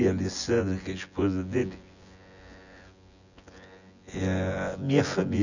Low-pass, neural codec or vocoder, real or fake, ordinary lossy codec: 7.2 kHz; vocoder, 24 kHz, 100 mel bands, Vocos; fake; none